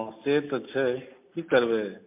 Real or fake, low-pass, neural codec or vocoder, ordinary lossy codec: real; 3.6 kHz; none; MP3, 32 kbps